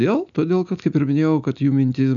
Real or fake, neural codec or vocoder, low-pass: real; none; 7.2 kHz